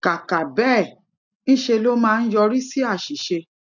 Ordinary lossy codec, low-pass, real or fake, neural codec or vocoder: none; 7.2 kHz; real; none